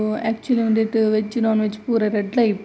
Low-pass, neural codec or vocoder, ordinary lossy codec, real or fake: none; none; none; real